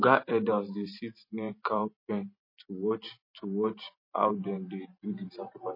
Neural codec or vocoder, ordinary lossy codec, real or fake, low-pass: vocoder, 44.1 kHz, 128 mel bands every 512 samples, BigVGAN v2; MP3, 24 kbps; fake; 5.4 kHz